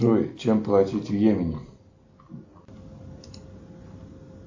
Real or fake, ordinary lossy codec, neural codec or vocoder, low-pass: real; AAC, 48 kbps; none; 7.2 kHz